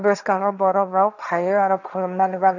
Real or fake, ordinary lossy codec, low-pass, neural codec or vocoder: fake; none; 7.2 kHz; codec, 16 kHz, 1.1 kbps, Voila-Tokenizer